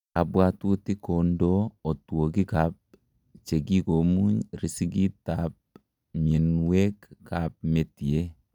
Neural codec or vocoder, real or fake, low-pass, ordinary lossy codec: none; real; 19.8 kHz; none